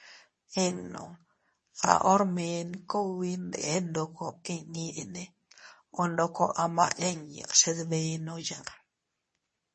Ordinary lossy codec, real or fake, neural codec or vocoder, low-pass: MP3, 32 kbps; fake; codec, 24 kHz, 0.9 kbps, WavTokenizer, medium speech release version 2; 10.8 kHz